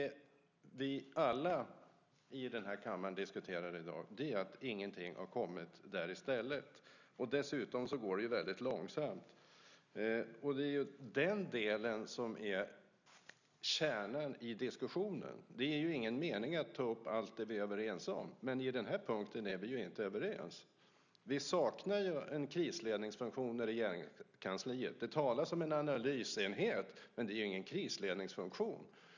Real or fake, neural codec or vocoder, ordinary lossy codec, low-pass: real; none; none; 7.2 kHz